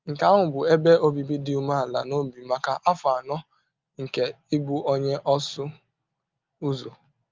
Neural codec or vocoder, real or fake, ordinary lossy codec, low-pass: none; real; Opus, 24 kbps; 7.2 kHz